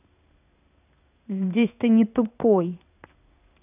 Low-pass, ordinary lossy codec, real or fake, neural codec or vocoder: 3.6 kHz; none; fake; codec, 16 kHz in and 24 kHz out, 1 kbps, XY-Tokenizer